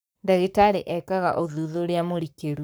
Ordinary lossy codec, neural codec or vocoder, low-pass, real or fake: none; codec, 44.1 kHz, 7.8 kbps, Pupu-Codec; none; fake